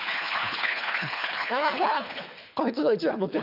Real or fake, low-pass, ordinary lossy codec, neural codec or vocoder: fake; 5.4 kHz; none; codec, 24 kHz, 3 kbps, HILCodec